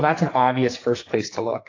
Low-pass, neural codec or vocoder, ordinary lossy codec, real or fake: 7.2 kHz; codec, 44.1 kHz, 2.6 kbps, SNAC; AAC, 32 kbps; fake